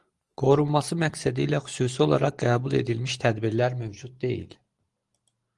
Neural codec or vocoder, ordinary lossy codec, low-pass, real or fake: none; Opus, 24 kbps; 10.8 kHz; real